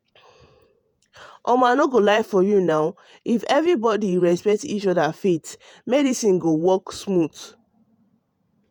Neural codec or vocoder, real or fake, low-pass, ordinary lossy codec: vocoder, 48 kHz, 128 mel bands, Vocos; fake; 19.8 kHz; none